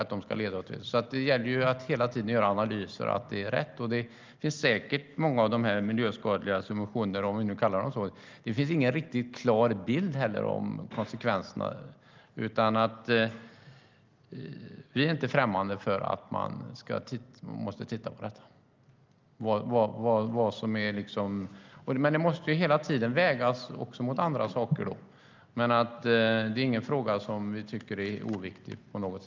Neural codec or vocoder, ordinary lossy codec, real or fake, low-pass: none; Opus, 24 kbps; real; 7.2 kHz